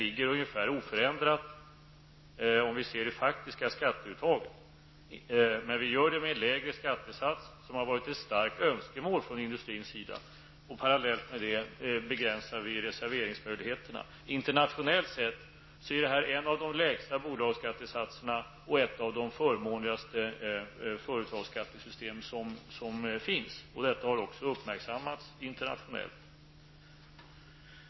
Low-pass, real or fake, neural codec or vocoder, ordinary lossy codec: 7.2 kHz; real; none; MP3, 24 kbps